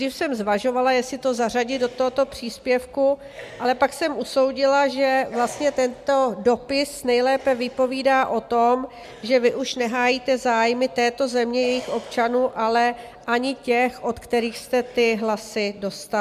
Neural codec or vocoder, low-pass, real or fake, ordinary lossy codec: none; 14.4 kHz; real; MP3, 96 kbps